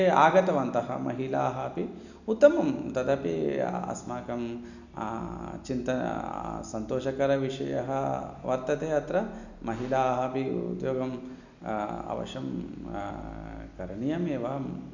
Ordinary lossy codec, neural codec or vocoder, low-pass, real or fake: none; none; 7.2 kHz; real